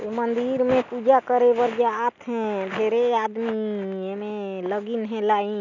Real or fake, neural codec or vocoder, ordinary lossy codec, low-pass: real; none; none; 7.2 kHz